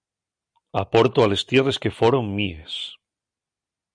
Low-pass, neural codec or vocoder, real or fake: 9.9 kHz; none; real